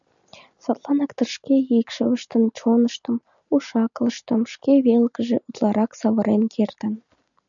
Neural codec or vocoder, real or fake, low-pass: none; real; 7.2 kHz